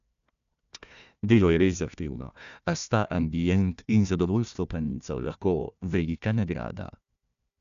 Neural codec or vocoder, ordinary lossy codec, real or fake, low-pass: codec, 16 kHz, 1 kbps, FunCodec, trained on Chinese and English, 50 frames a second; AAC, 96 kbps; fake; 7.2 kHz